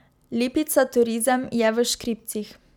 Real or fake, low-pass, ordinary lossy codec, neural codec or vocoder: real; 19.8 kHz; none; none